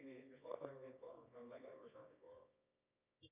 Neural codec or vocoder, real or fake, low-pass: codec, 24 kHz, 0.9 kbps, WavTokenizer, medium music audio release; fake; 3.6 kHz